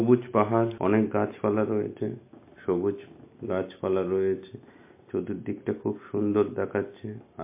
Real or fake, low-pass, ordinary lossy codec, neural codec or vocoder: real; 3.6 kHz; MP3, 24 kbps; none